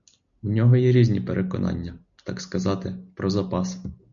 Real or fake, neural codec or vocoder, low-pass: real; none; 7.2 kHz